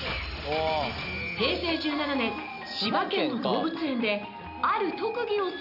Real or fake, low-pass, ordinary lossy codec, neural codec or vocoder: real; 5.4 kHz; none; none